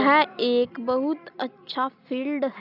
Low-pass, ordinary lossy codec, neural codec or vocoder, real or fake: 5.4 kHz; none; none; real